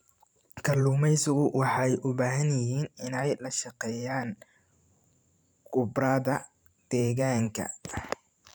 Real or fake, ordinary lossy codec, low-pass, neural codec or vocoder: fake; none; none; vocoder, 44.1 kHz, 128 mel bands every 512 samples, BigVGAN v2